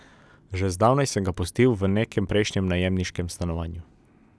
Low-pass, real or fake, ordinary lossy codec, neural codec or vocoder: none; real; none; none